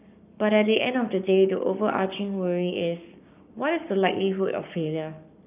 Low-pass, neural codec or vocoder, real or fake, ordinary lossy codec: 3.6 kHz; codec, 44.1 kHz, 7.8 kbps, Pupu-Codec; fake; none